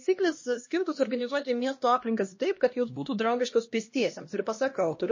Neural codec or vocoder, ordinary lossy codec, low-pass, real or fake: codec, 16 kHz, 1 kbps, X-Codec, HuBERT features, trained on LibriSpeech; MP3, 32 kbps; 7.2 kHz; fake